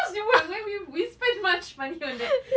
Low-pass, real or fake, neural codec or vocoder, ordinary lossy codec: none; real; none; none